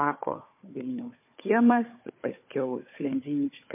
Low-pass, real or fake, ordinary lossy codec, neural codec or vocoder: 3.6 kHz; fake; MP3, 24 kbps; codec, 16 kHz, 4 kbps, FunCodec, trained on Chinese and English, 50 frames a second